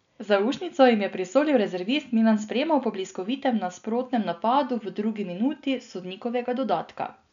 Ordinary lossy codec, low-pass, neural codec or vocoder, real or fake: none; 7.2 kHz; none; real